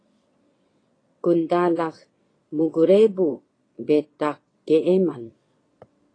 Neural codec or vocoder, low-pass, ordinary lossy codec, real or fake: vocoder, 22.05 kHz, 80 mel bands, WaveNeXt; 9.9 kHz; AAC, 32 kbps; fake